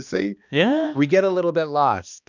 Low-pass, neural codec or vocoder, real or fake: 7.2 kHz; codec, 16 kHz, 2 kbps, X-Codec, HuBERT features, trained on balanced general audio; fake